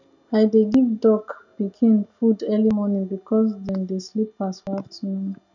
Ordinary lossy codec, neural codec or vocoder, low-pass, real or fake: none; none; 7.2 kHz; real